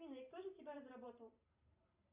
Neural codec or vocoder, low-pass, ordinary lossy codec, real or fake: vocoder, 44.1 kHz, 128 mel bands every 512 samples, BigVGAN v2; 3.6 kHz; Opus, 64 kbps; fake